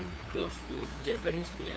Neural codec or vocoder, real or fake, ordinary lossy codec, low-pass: codec, 16 kHz, 2 kbps, FunCodec, trained on LibriTTS, 25 frames a second; fake; none; none